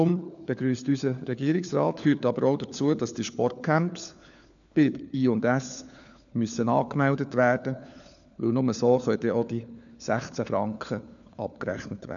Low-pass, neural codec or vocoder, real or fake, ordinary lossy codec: 7.2 kHz; codec, 16 kHz, 4 kbps, FunCodec, trained on LibriTTS, 50 frames a second; fake; none